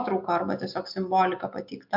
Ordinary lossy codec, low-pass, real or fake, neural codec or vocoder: MP3, 48 kbps; 5.4 kHz; real; none